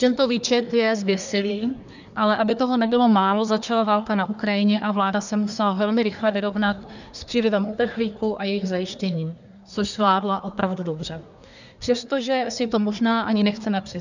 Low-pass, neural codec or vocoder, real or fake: 7.2 kHz; codec, 24 kHz, 1 kbps, SNAC; fake